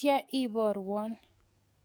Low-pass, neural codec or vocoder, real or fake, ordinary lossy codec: none; codec, 44.1 kHz, 7.8 kbps, DAC; fake; none